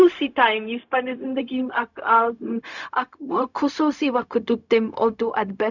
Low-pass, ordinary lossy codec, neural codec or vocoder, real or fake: 7.2 kHz; none; codec, 16 kHz, 0.4 kbps, LongCat-Audio-Codec; fake